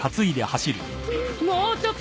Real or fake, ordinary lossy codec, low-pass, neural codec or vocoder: real; none; none; none